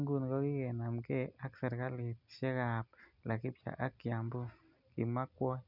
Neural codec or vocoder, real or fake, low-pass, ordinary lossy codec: none; real; 5.4 kHz; none